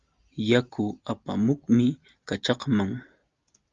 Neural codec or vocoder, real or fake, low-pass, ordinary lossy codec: none; real; 7.2 kHz; Opus, 32 kbps